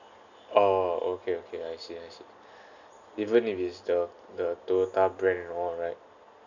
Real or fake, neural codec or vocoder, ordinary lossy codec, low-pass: real; none; none; 7.2 kHz